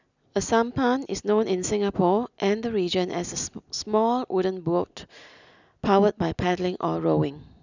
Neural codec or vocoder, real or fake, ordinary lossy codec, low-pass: none; real; none; 7.2 kHz